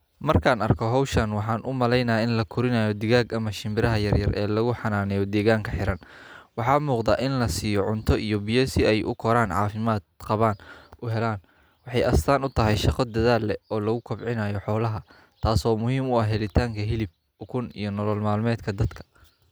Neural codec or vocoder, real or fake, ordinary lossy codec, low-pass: none; real; none; none